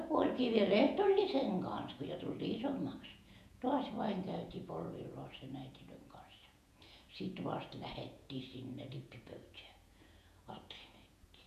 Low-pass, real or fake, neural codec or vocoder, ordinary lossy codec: 14.4 kHz; fake; vocoder, 48 kHz, 128 mel bands, Vocos; none